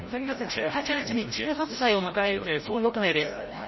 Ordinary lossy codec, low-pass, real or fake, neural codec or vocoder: MP3, 24 kbps; 7.2 kHz; fake; codec, 16 kHz, 0.5 kbps, FreqCodec, larger model